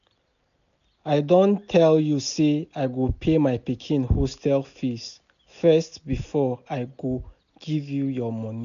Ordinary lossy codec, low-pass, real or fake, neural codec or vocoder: none; 7.2 kHz; real; none